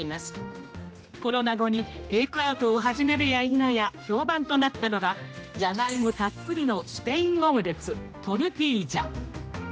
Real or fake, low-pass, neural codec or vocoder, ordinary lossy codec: fake; none; codec, 16 kHz, 1 kbps, X-Codec, HuBERT features, trained on general audio; none